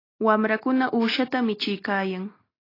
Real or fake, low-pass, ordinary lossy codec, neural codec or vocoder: real; 5.4 kHz; AAC, 24 kbps; none